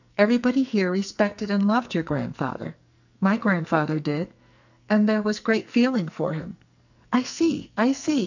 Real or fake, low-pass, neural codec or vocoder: fake; 7.2 kHz; codec, 44.1 kHz, 2.6 kbps, SNAC